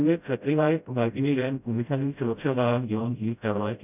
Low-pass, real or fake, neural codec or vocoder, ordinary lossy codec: 3.6 kHz; fake; codec, 16 kHz, 0.5 kbps, FreqCodec, smaller model; none